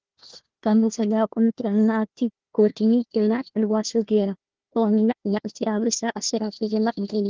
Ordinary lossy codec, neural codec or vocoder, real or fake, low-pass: Opus, 16 kbps; codec, 16 kHz, 1 kbps, FunCodec, trained on Chinese and English, 50 frames a second; fake; 7.2 kHz